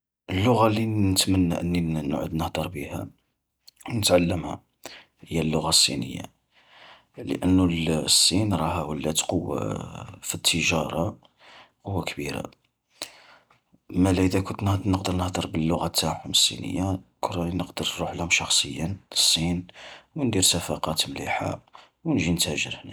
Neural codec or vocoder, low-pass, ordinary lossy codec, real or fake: none; none; none; real